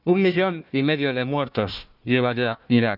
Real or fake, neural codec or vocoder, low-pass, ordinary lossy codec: fake; codec, 16 kHz, 1 kbps, FunCodec, trained on Chinese and English, 50 frames a second; 5.4 kHz; none